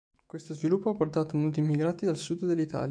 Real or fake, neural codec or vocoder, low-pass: fake; autoencoder, 48 kHz, 128 numbers a frame, DAC-VAE, trained on Japanese speech; 9.9 kHz